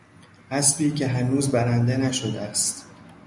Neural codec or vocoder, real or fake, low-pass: none; real; 10.8 kHz